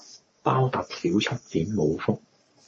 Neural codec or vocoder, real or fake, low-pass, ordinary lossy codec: codec, 44.1 kHz, 3.4 kbps, Pupu-Codec; fake; 9.9 kHz; MP3, 32 kbps